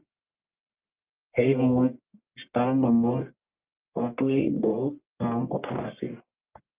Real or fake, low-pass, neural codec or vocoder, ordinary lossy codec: fake; 3.6 kHz; codec, 44.1 kHz, 1.7 kbps, Pupu-Codec; Opus, 32 kbps